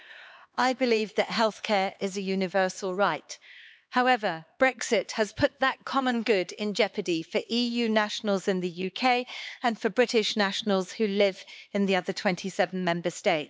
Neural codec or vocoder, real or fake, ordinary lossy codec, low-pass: codec, 16 kHz, 4 kbps, X-Codec, HuBERT features, trained on LibriSpeech; fake; none; none